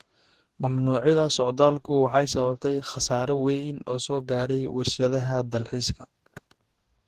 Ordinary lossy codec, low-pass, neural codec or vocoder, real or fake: Opus, 16 kbps; 14.4 kHz; codec, 44.1 kHz, 2.6 kbps, DAC; fake